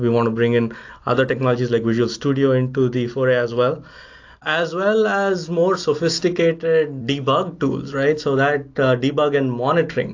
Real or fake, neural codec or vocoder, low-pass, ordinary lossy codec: real; none; 7.2 kHz; AAC, 48 kbps